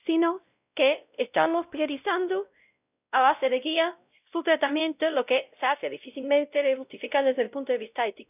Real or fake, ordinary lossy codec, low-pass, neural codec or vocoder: fake; none; 3.6 kHz; codec, 16 kHz, 0.5 kbps, X-Codec, WavLM features, trained on Multilingual LibriSpeech